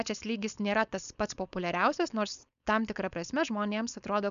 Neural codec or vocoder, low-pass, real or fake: codec, 16 kHz, 4.8 kbps, FACodec; 7.2 kHz; fake